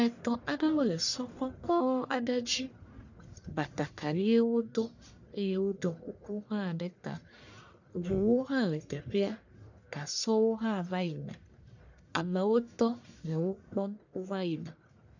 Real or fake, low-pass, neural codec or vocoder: fake; 7.2 kHz; codec, 44.1 kHz, 1.7 kbps, Pupu-Codec